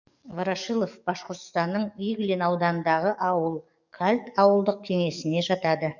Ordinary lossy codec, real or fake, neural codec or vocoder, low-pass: none; fake; codec, 44.1 kHz, 7.8 kbps, DAC; 7.2 kHz